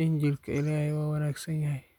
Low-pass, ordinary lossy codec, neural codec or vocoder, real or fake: 19.8 kHz; none; none; real